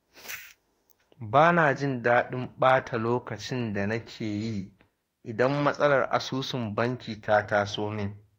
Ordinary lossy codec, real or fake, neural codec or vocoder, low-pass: AAC, 48 kbps; fake; autoencoder, 48 kHz, 32 numbers a frame, DAC-VAE, trained on Japanese speech; 19.8 kHz